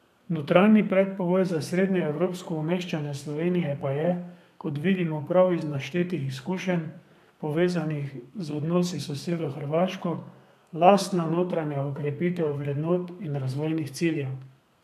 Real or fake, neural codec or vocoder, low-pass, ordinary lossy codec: fake; codec, 32 kHz, 1.9 kbps, SNAC; 14.4 kHz; none